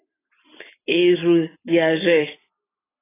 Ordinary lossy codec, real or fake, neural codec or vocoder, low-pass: AAC, 16 kbps; real; none; 3.6 kHz